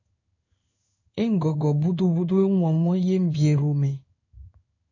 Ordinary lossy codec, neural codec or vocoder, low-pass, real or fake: AAC, 48 kbps; codec, 16 kHz in and 24 kHz out, 1 kbps, XY-Tokenizer; 7.2 kHz; fake